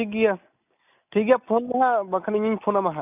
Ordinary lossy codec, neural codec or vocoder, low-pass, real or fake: none; none; 3.6 kHz; real